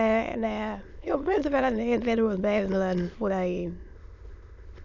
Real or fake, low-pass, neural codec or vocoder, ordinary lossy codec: fake; 7.2 kHz; autoencoder, 22.05 kHz, a latent of 192 numbers a frame, VITS, trained on many speakers; none